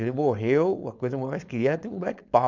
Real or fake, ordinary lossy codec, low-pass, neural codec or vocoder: fake; none; 7.2 kHz; codec, 16 kHz, 4.8 kbps, FACodec